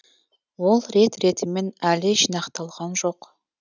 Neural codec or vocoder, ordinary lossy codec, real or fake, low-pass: none; none; real; 7.2 kHz